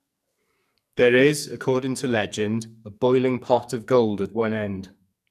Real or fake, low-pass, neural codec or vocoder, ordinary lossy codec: fake; 14.4 kHz; codec, 44.1 kHz, 2.6 kbps, SNAC; none